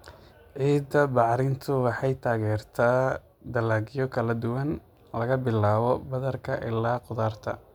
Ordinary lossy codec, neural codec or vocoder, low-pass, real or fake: MP3, 96 kbps; none; 19.8 kHz; real